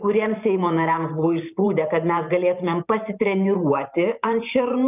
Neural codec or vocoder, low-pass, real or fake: vocoder, 44.1 kHz, 128 mel bands every 512 samples, BigVGAN v2; 3.6 kHz; fake